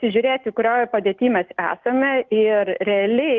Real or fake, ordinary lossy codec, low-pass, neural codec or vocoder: real; Opus, 24 kbps; 7.2 kHz; none